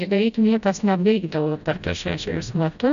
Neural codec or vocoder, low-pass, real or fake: codec, 16 kHz, 0.5 kbps, FreqCodec, smaller model; 7.2 kHz; fake